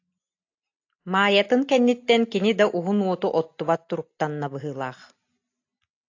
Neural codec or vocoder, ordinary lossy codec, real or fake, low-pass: none; AAC, 48 kbps; real; 7.2 kHz